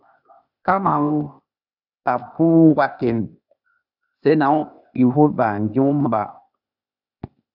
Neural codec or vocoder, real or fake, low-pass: codec, 16 kHz, 0.8 kbps, ZipCodec; fake; 5.4 kHz